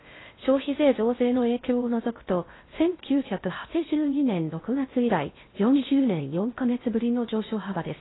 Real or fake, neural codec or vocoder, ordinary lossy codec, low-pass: fake; codec, 16 kHz in and 24 kHz out, 0.6 kbps, FocalCodec, streaming, 2048 codes; AAC, 16 kbps; 7.2 kHz